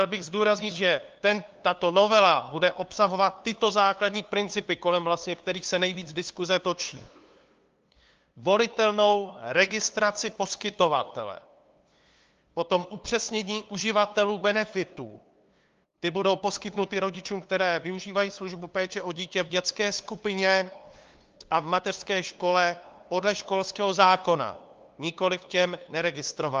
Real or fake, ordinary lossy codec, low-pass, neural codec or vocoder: fake; Opus, 32 kbps; 7.2 kHz; codec, 16 kHz, 2 kbps, FunCodec, trained on LibriTTS, 25 frames a second